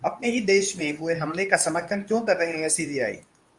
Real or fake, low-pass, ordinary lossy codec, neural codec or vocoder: fake; 10.8 kHz; Opus, 64 kbps; codec, 24 kHz, 0.9 kbps, WavTokenizer, medium speech release version 2